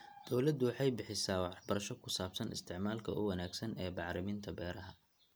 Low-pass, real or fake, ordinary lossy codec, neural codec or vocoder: none; real; none; none